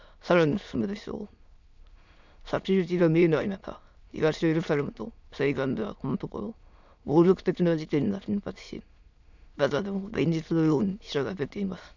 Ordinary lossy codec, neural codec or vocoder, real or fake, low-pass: none; autoencoder, 22.05 kHz, a latent of 192 numbers a frame, VITS, trained on many speakers; fake; 7.2 kHz